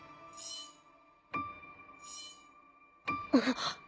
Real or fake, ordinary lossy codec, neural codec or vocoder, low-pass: real; none; none; none